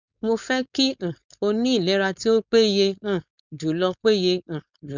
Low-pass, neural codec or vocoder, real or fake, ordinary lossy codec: 7.2 kHz; codec, 16 kHz, 4.8 kbps, FACodec; fake; none